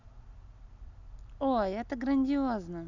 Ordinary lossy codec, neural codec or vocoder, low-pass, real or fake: none; none; 7.2 kHz; real